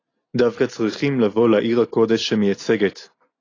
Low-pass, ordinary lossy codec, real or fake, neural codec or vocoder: 7.2 kHz; AAC, 32 kbps; real; none